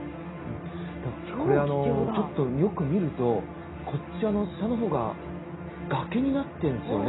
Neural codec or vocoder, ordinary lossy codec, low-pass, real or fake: none; AAC, 16 kbps; 7.2 kHz; real